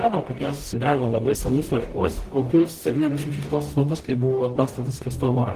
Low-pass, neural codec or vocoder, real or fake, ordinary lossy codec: 14.4 kHz; codec, 44.1 kHz, 0.9 kbps, DAC; fake; Opus, 16 kbps